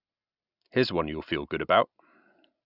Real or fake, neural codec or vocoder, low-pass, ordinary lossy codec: real; none; 5.4 kHz; none